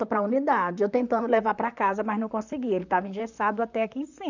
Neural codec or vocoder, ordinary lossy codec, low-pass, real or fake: vocoder, 44.1 kHz, 128 mel bands, Pupu-Vocoder; none; 7.2 kHz; fake